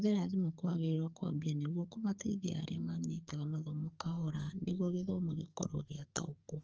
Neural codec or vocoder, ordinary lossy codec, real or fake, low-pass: codec, 44.1 kHz, 2.6 kbps, SNAC; Opus, 24 kbps; fake; 7.2 kHz